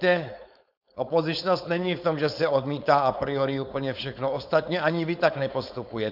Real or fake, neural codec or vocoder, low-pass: fake; codec, 16 kHz, 4.8 kbps, FACodec; 5.4 kHz